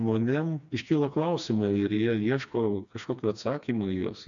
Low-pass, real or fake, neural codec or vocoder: 7.2 kHz; fake; codec, 16 kHz, 2 kbps, FreqCodec, smaller model